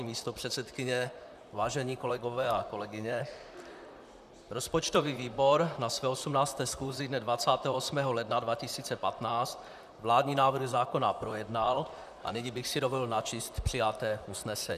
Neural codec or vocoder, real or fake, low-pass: vocoder, 44.1 kHz, 128 mel bands, Pupu-Vocoder; fake; 14.4 kHz